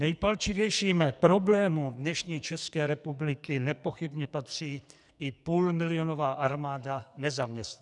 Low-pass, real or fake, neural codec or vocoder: 10.8 kHz; fake; codec, 44.1 kHz, 2.6 kbps, SNAC